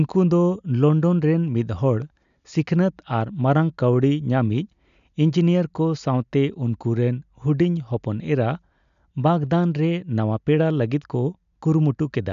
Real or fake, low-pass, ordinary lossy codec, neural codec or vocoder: real; 7.2 kHz; none; none